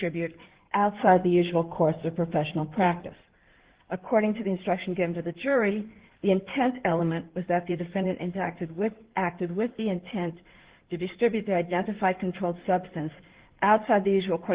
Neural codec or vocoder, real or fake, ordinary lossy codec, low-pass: codec, 16 kHz in and 24 kHz out, 2.2 kbps, FireRedTTS-2 codec; fake; Opus, 16 kbps; 3.6 kHz